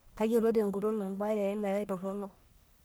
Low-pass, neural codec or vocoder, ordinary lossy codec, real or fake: none; codec, 44.1 kHz, 1.7 kbps, Pupu-Codec; none; fake